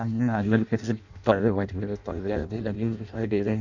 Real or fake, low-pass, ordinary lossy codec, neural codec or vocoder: fake; 7.2 kHz; none; codec, 16 kHz in and 24 kHz out, 0.6 kbps, FireRedTTS-2 codec